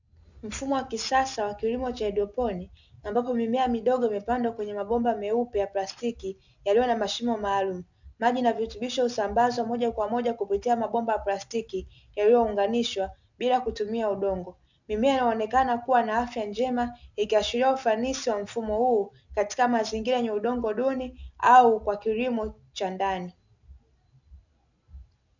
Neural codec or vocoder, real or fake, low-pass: none; real; 7.2 kHz